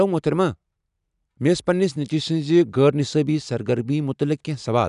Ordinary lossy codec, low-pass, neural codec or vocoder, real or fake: none; 10.8 kHz; none; real